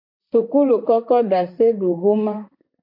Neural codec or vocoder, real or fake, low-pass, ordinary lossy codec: vocoder, 44.1 kHz, 128 mel bands, Pupu-Vocoder; fake; 5.4 kHz; MP3, 32 kbps